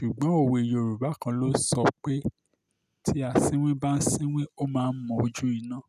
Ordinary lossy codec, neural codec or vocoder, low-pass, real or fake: none; none; 14.4 kHz; real